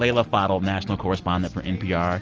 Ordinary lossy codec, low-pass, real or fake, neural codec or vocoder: Opus, 24 kbps; 7.2 kHz; real; none